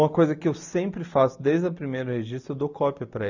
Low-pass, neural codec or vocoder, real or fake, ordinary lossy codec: 7.2 kHz; none; real; none